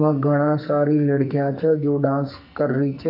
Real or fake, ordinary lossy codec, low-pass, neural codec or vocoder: fake; none; 5.4 kHz; codec, 44.1 kHz, 2.6 kbps, SNAC